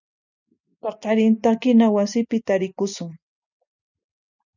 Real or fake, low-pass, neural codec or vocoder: real; 7.2 kHz; none